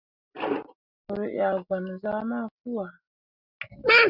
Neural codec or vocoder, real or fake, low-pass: none; real; 5.4 kHz